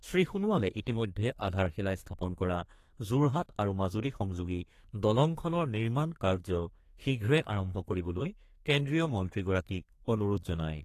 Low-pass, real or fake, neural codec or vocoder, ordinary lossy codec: 14.4 kHz; fake; codec, 32 kHz, 1.9 kbps, SNAC; AAC, 48 kbps